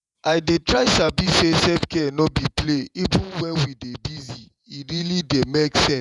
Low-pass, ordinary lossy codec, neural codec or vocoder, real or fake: 10.8 kHz; none; vocoder, 48 kHz, 128 mel bands, Vocos; fake